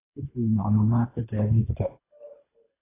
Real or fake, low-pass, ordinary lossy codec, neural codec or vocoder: fake; 3.6 kHz; AAC, 16 kbps; codec, 24 kHz, 3 kbps, HILCodec